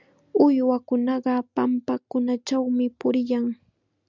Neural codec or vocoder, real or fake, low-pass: none; real; 7.2 kHz